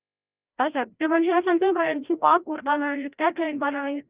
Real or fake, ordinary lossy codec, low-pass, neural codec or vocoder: fake; Opus, 24 kbps; 3.6 kHz; codec, 16 kHz, 0.5 kbps, FreqCodec, larger model